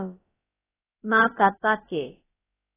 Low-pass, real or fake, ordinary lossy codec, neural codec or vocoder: 3.6 kHz; fake; AAC, 16 kbps; codec, 16 kHz, about 1 kbps, DyCAST, with the encoder's durations